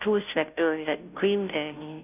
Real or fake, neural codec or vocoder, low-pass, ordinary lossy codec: fake; codec, 16 kHz, 0.5 kbps, FunCodec, trained on Chinese and English, 25 frames a second; 3.6 kHz; none